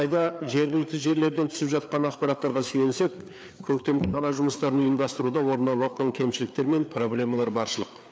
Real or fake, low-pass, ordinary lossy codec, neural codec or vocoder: fake; none; none; codec, 16 kHz, 4 kbps, FreqCodec, larger model